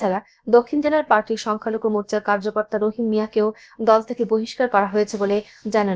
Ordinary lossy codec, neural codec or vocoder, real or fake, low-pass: none; codec, 16 kHz, about 1 kbps, DyCAST, with the encoder's durations; fake; none